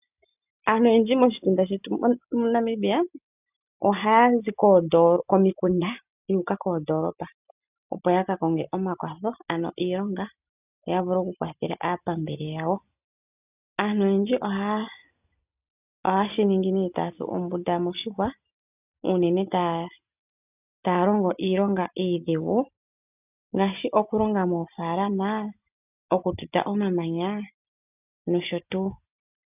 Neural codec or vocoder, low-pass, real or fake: none; 3.6 kHz; real